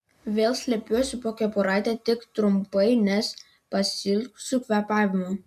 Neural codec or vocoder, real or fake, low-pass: none; real; 14.4 kHz